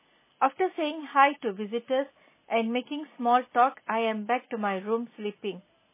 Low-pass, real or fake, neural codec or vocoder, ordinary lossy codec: 3.6 kHz; real; none; MP3, 16 kbps